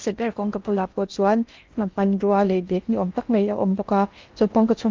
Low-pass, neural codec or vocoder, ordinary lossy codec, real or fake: 7.2 kHz; codec, 16 kHz in and 24 kHz out, 0.6 kbps, FocalCodec, streaming, 4096 codes; Opus, 32 kbps; fake